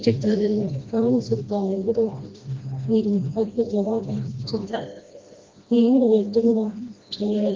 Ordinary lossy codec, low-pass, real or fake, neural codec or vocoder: Opus, 32 kbps; 7.2 kHz; fake; codec, 16 kHz, 1 kbps, FreqCodec, smaller model